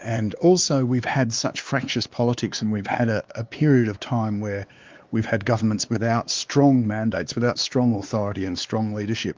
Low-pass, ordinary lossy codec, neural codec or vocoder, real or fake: 7.2 kHz; Opus, 24 kbps; codec, 16 kHz, 2 kbps, X-Codec, WavLM features, trained on Multilingual LibriSpeech; fake